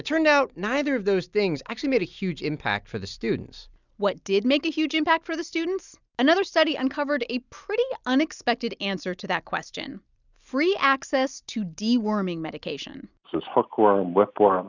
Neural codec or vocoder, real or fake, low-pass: none; real; 7.2 kHz